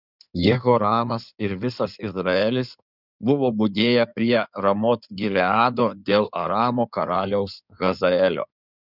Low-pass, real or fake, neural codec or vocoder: 5.4 kHz; fake; codec, 16 kHz in and 24 kHz out, 2.2 kbps, FireRedTTS-2 codec